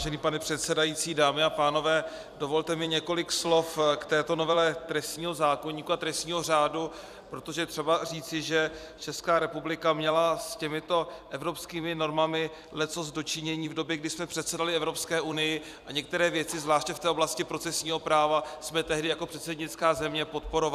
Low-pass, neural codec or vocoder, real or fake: 14.4 kHz; vocoder, 44.1 kHz, 128 mel bands every 256 samples, BigVGAN v2; fake